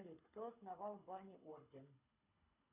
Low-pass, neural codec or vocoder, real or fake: 3.6 kHz; codec, 24 kHz, 6 kbps, HILCodec; fake